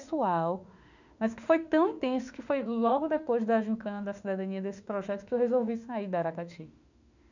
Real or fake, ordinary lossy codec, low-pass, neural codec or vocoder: fake; none; 7.2 kHz; autoencoder, 48 kHz, 32 numbers a frame, DAC-VAE, trained on Japanese speech